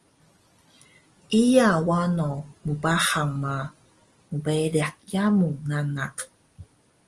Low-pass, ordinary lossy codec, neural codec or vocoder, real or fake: 10.8 kHz; Opus, 24 kbps; none; real